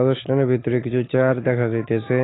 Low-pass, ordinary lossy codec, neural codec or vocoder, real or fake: 7.2 kHz; AAC, 16 kbps; none; real